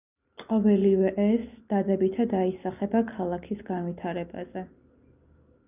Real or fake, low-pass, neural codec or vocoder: real; 3.6 kHz; none